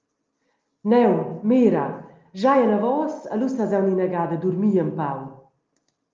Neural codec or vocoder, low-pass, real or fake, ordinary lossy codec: none; 7.2 kHz; real; Opus, 24 kbps